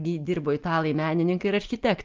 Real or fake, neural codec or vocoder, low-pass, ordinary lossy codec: real; none; 7.2 kHz; Opus, 24 kbps